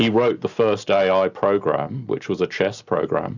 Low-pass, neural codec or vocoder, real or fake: 7.2 kHz; none; real